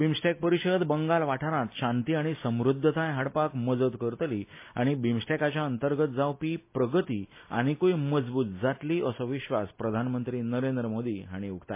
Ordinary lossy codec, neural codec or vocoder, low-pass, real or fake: MP3, 24 kbps; none; 3.6 kHz; real